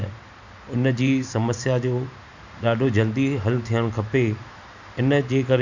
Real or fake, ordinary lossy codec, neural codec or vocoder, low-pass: fake; none; vocoder, 44.1 kHz, 128 mel bands every 512 samples, BigVGAN v2; 7.2 kHz